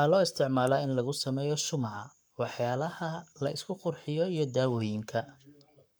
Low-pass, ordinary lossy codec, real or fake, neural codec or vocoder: none; none; fake; codec, 44.1 kHz, 7.8 kbps, Pupu-Codec